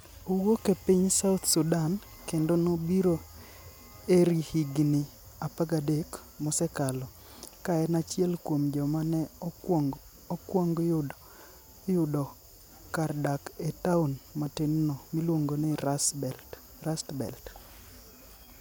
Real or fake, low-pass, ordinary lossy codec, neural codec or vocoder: real; none; none; none